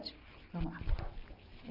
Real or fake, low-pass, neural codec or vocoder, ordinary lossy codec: real; 5.4 kHz; none; none